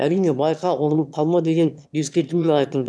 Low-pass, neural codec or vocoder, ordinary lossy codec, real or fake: none; autoencoder, 22.05 kHz, a latent of 192 numbers a frame, VITS, trained on one speaker; none; fake